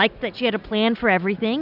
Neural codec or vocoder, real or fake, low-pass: none; real; 5.4 kHz